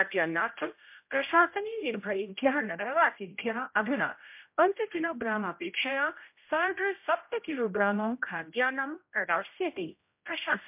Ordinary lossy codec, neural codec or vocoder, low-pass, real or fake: MP3, 32 kbps; codec, 16 kHz, 0.5 kbps, X-Codec, HuBERT features, trained on general audio; 3.6 kHz; fake